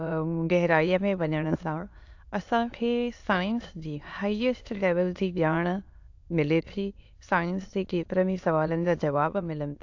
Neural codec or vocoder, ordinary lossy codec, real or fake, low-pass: autoencoder, 22.05 kHz, a latent of 192 numbers a frame, VITS, trained on many speakers; AAC, 48 kbps; fake; 7.2 kHz